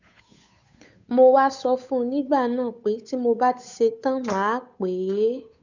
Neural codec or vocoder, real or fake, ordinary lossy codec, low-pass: codec, 16 kHz, 8 kbps, FunCodec, trained on Chinese and English, 25 frames a second; fake; none; 7.2 kHz